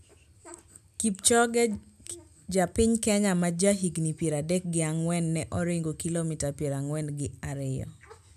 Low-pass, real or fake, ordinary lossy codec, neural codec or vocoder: 14.4 kHz; real; none; none